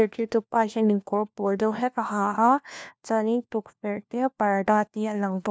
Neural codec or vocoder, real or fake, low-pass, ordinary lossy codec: codec, 16 kHz, 1 kbps, FunCodec, trained on LibriTTS, 50 frames a second; fake; none; none